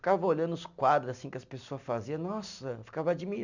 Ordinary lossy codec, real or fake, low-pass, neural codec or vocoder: none; real; 7.2 kHz; none